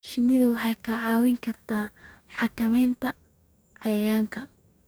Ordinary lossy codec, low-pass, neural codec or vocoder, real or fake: none; none; codec, 44.1 kHz, 2.6 kbps, DAC; fake